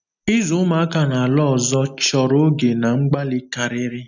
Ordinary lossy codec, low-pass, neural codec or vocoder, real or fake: none; 7.2 kHz; none; real